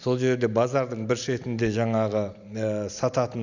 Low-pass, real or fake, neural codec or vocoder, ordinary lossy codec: 7.2 kHz; real; none; none